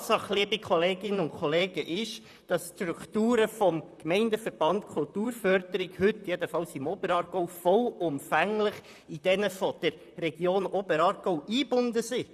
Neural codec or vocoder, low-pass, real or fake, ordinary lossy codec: vocoder, 44.1 kHz, 128 mel bands, Pupu-Vocoder; 14.4 kHz; fake; none